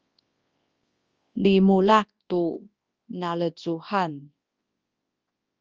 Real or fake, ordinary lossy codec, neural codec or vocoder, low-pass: fake; Opus, 24 kbps; codec, 24 kHz, 0.9 kbps, WavTokenizer, large speech release; 7.2 kHz